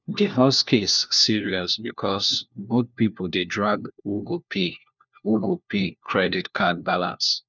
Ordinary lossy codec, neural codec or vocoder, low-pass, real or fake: none; codec, 16 kHz, 1 kbps, FunCodec, trained on LibriTTS, 50 frames a second; 7.2 kHz; fake